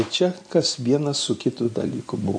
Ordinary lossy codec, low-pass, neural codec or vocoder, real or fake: MP3, 48 kbps; 9.9 kHz; none; real